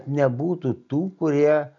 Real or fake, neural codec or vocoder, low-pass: real; none; 7.2 kHz